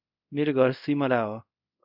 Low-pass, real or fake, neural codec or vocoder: 5.4 kHz; fake; codec, 24 kHz, 0.5 kbps, DualCodec